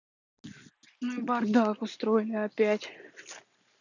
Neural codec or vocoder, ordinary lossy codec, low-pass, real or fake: none; none; 7.2 kHz; real